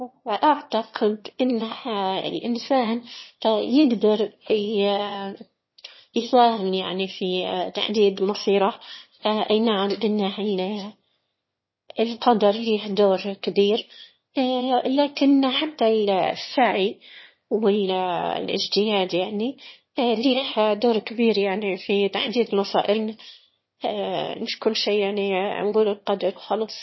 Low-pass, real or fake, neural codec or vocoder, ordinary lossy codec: 7.2 kHz; fake; autoencoder, 22.05 kHz, a latent of 192 numbers a frame, VITS, trained on one speaker; MP3, 24 kbps